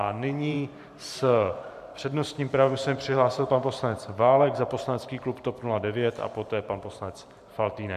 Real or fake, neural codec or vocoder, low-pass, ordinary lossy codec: fake; vocoder, 44.1 kHz, 128 mel bands every 512 samples, BigVGAN v2; 14.4 kHz; MP3, 96 kbps